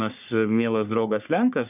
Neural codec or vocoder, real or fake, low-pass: codec, 44.1 kHz, 3.4 kbps, Pupu-Codec; fake; 3.6 kHz